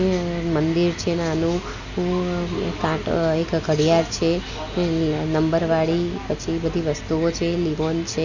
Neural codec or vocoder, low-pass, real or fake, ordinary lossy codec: none; 7.2 kHz; real; none